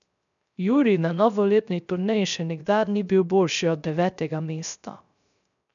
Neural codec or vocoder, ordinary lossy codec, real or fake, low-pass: codec, 16 kHz, 0.3 kbps, FocalCodec; none; fake; 7.2 kHz